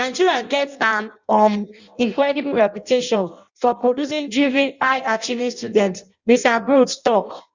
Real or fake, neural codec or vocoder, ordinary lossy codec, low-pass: fake; codec, 16 kHz in and 24 kHz out, 0.6 kbps, FireRedTTS-2 codec; Opus, 64 kbps; 7.2 kHz